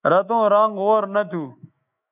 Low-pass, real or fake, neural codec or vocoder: 3.6 kHz; fake; autoencoder, 48 kHz, 128 numbers a frame, DAC-VAE, trained on Japanese speech